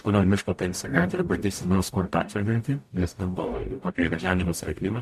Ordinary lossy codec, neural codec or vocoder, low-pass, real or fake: MP3, 64 kbps; codec, 44.1 kHz, 0.9 kbps, DAC; 14.4 kHz; fake